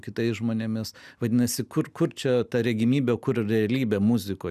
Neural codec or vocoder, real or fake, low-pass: none; real; 14.4 kHz